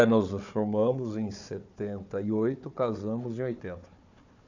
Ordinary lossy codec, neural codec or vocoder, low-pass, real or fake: none; codec, 16 kHz, 4 kbps, FunCodec, trained on Chinese and English, 50 frames a second; 7.2 kHz; fake